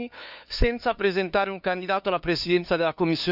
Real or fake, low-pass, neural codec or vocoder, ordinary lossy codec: fake; 5.4 kHz; codec, 16 kHz, 2 kbps, FunCodec, trained on LibriTTS, 25 frames a second; none